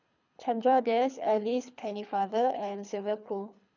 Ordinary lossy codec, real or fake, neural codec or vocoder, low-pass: none; fake; codec, 24 kHz, 3 kbps, HILCodec; 7.2 kHz